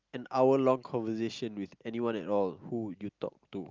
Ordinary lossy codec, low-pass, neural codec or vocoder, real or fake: Opus, 24 kbps; 7.2 kHz; none; real